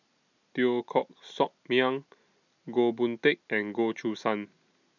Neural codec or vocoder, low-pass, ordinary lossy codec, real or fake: none; 7.2 kHz; none; real